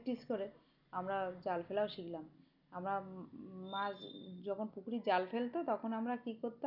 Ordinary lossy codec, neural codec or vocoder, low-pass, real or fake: none; none; 5.4 kHz; real